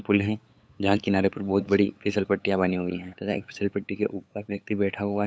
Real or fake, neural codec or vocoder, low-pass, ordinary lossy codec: fake; codec, 16 kHz, 8 kbps, FunCodec, trained on LibriTTS, 25 frames a second; none; none